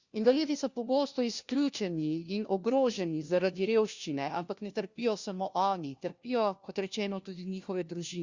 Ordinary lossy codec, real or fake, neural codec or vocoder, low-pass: Opus, 64 kbps; fake; codec, 16 kHz, 1 kbps, FunCodec, trained on LibriTTS, 50 frames a second; 7.2 kHz